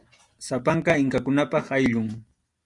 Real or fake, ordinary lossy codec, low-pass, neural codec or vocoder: real; Opus, 64 kbps; 10.8 kHz; none